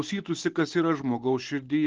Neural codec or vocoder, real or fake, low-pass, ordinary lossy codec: none; real; 7.2 kHz; Opus, 16 kbps